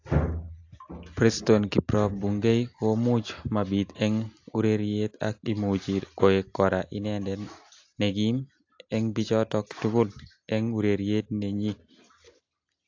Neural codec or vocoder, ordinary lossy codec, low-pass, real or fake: none; AAC, 48 kbps; 7.2 kHz; real